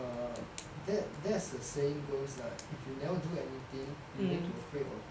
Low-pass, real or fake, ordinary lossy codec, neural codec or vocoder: none; real; none; none